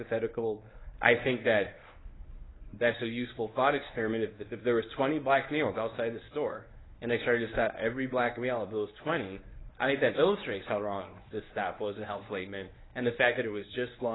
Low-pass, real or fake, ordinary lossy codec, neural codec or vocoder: 7.2 kHz; fake; AAC, 16 kbps; codec, 24 kHz, 0.9 kbps, WavTokenizer, medium speech release version 1